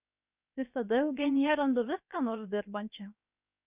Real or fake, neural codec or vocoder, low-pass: fake; codec, 16 kHz, 0.7 kbps, FocalCodec; 3.6 kHz